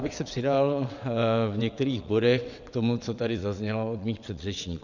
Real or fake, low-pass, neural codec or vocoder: fake; 7.2 kHz; vocoder, 22.05 kHz, 80 mel bands, WaveNeXt